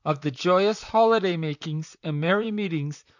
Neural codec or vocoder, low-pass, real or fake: vocoder, 44.1 kHz, 128 mel bands, Pupu-Vocoder; 7.2 kHz; fake